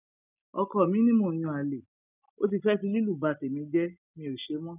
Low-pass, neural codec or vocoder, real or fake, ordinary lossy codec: 3.6 kHz; none; real; none